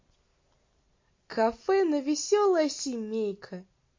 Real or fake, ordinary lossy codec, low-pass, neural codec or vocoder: real; MP3, 32 kbps; 7.2 kHz; none